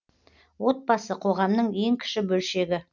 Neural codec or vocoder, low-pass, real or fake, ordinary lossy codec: none; 7.2 kHz; real; none